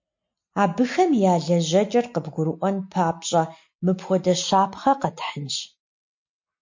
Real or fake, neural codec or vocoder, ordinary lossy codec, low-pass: real; none; MP3, 48 kbps; 7.2 kHz